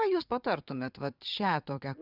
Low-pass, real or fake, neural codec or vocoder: 5.4 kHz; fake; vocoder, 22.05 kHz, 80 mel bands, Vocos